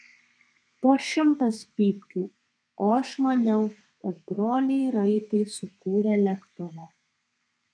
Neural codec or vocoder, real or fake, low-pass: codec, 32 kHz, 1.9 kbps, SNAC; fake; 9.9 kHz